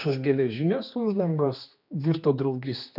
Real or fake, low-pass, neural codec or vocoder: fake; 5.4 kHz; codec, 16 kHz, 2 kbps, X-Codec, HuBERT features, trained on general audio